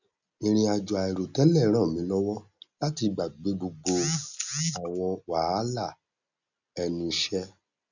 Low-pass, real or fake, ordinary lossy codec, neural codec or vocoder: 7.2 kHz; real; none; none